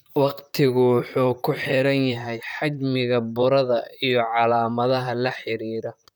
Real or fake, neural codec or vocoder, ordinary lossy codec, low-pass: fake; vocoder, 44.1 kHz, 128 mel bands, Pupu-Vocoder; none; none